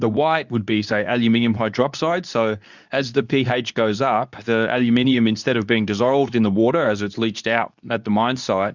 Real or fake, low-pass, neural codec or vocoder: fake; 7.2 kHz; codec, 24 kHz, 0.9 kbps, WavTokenizer, medium speech release version 2